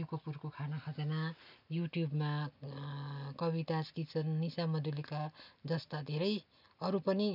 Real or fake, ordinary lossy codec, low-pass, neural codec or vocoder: real; none; 5.4 kHz; none